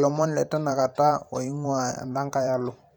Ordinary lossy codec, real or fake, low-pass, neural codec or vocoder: none; fake; 19.8 kHz; vocoder, 44.1 kHz, 128 mel bands every 512 samples, BigVGAN v2